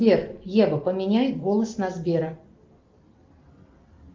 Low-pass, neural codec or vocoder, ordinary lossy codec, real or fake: 7.2 kHz; none; Opus, 24 kbps; real